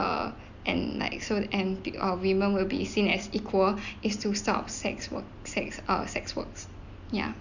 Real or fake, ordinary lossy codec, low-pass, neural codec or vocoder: real; none; 7.2 kHz; none